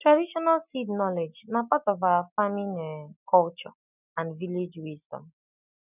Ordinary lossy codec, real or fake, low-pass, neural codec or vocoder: none; real; 3.6 kHz; none